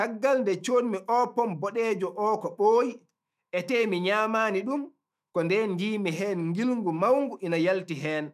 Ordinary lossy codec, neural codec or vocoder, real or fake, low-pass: none; autoencoder, 48 kHz, 128 numbers a frame, DAC-VAE, trained on Japanese speech; fake; 14.4 kHz